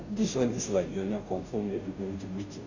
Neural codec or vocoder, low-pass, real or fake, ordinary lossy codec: codec, 16 kHz, 0.5 kbps, FunCodec, trained on Chinese and English, 25 frames a second; 7.2 kHz; fake; none